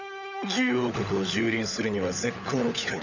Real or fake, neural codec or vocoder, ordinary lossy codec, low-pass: fake; codec, 16 kHz, 16 kbps, FunCodec, trained on LibriTTS, 50 frames a second; none; 7.2 kHz